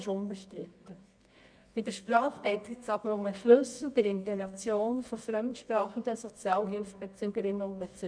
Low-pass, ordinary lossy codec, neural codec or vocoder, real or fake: 9.9 kHz; none; codec, 24 kHz, 0.9 kbps, WavTokenizer, medium music audio release; fake